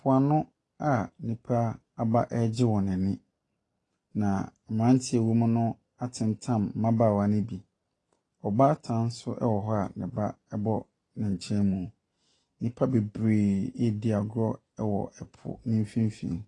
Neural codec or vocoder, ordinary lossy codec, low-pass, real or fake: none; AAC, 32 kbps; 10.8 kHz; real